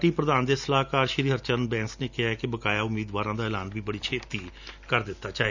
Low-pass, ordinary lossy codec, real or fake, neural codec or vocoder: 7.2 kHz; none; real; none